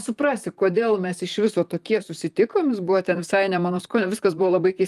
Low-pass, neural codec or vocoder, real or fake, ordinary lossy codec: 14.4 kHz; vocoder, 44.1 kHz, 128 mel bands, Pupu-Vocoder; fake; Opus, 32 kbps